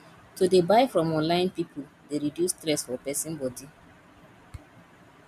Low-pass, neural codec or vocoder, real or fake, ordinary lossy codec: 14.4 kHz; none; real; none